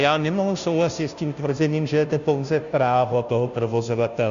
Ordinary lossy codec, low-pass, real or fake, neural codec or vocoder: AAC, 96 kbps; 7.2 kHz; fake; codec, 16 kHz, 0.5 kbps, FunCodec, trained on Chinese and English, 25 frames a second